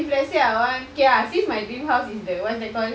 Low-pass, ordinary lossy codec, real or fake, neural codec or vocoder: none; none; real; none